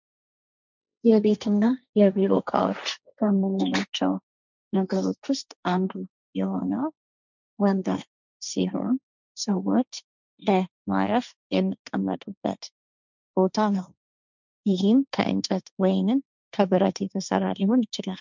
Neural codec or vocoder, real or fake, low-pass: codec, 16 kHz, 1.1 kbps, Voila-Tokenizer; fake; 7.2 kHz